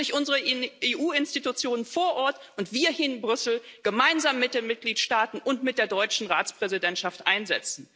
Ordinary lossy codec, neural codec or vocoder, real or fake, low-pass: none; none; real; none